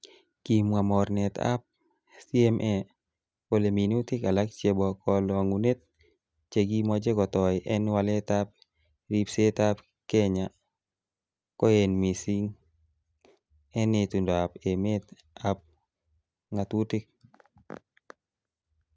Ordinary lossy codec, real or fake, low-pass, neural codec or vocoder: none; real; none; none